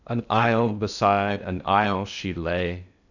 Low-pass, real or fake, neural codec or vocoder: 7.2 kHz; fake; codec, 16 kHz in and 24 kHz out, 0.8 kbps, FocalCodec, streaming, 65536 codes